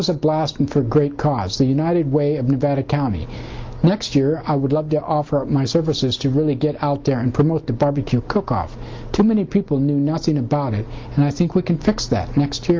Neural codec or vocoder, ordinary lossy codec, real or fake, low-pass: none; Opus, 32 kbps; real; 7.2 kHz